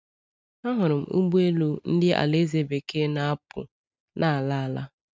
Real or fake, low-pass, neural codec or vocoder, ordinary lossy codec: real; none; none; none